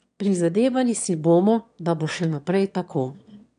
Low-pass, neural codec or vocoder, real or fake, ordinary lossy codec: 9.9 kHz; autoencoder, 22.05 kHz, a latent of 192 numbers a frame, VITS, trained on one speaker; fake; none